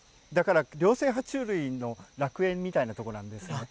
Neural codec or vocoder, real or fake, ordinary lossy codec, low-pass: none; real; none; none